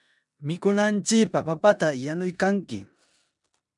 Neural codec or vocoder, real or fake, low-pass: codec, 16 kHz in and 24 kHz out, 0.9 kbps, LongCat-Audio-Codec, four codebook decoder; fake; 10.8 kHz